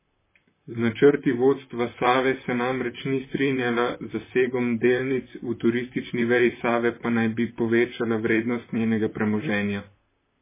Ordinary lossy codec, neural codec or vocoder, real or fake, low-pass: MP3, 16 kbps; vocoder, 44.1 kHz, 128 mel bands, Pupu-Vocoder; fake; 3.6 kHz